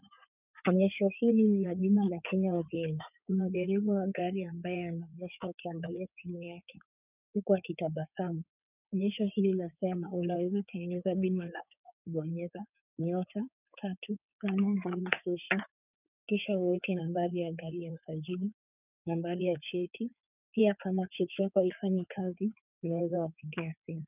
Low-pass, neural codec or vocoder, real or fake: 3.6 kHz; codec, 16 kHz in and 24 kHz out, 2.2 kbps, FireRedTTS-2 codec; fake